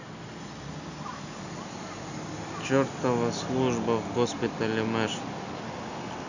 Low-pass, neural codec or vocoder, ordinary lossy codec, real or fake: 7.2 kHz; none; none; real